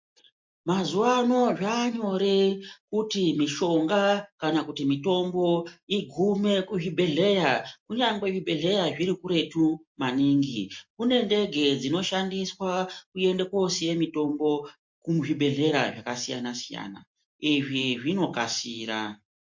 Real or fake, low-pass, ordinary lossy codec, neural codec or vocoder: real; 7.2 kHz; MP3, 48 kbps; none